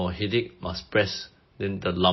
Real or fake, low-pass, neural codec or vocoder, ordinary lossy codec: real; 7.2 kHz; none; MP3, 24 kbps